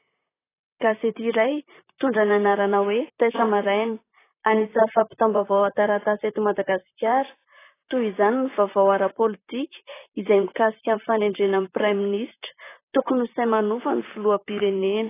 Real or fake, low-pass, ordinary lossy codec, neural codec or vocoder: fake; 3.6 kHz; AAC, 16 kbps; vocoder, 44.1 kHz, 128 mel bands, Pupu-Vocoder